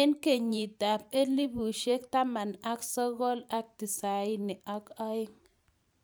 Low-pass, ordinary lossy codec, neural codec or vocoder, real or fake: none; none; vocoder, 44.1 kHz, 128 mel bands every 512 samples, BigVGAN v2; fake